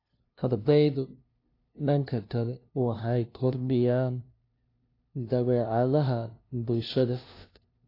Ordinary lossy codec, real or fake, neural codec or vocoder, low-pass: AAC, 32 kbps; fake; codec, 16 kHz, 0.5 kbps, FunCodec, trained on LibriTTS, 25 frames a second; 5.4 kHz